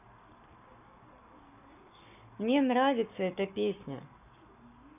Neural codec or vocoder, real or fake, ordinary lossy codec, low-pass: codec, 44.1 kHz, 7.8 kbps, Pupu-Codec; fake; none; 3.6 kHz